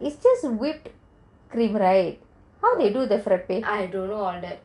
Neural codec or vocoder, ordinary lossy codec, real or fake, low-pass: none; none; real; 10.8 kHz